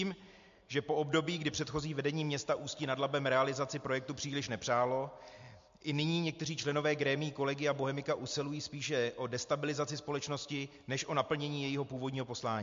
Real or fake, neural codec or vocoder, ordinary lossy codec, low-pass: real; none; MP3, 48 kbps; 7.2 kHz